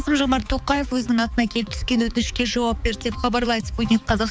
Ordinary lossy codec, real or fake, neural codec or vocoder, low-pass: none; fake; codec, 16 kHz, 4 kbps, X-Codec, HuBERT features, trained on balanced general audio; none